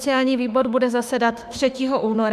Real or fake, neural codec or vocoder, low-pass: fake; autoencoder, 48 kHz, 32 numbers a frame, DAC-VAE, trained on Japanese speech; 14.4 kHz